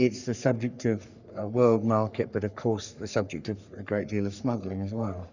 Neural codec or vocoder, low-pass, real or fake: codec, 44.1 kHz, 3.4 kbps, Pupu-Codec; 7.2 kHz; fake